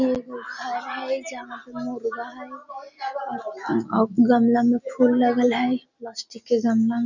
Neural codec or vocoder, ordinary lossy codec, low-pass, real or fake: none; none; 7.2 kHz; real